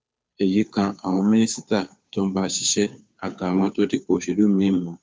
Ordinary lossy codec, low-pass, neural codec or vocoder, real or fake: none; none; codec, 16 kHz, 2 kbps, FunCodec, trained on Chinese and English, 25 frames a second; fake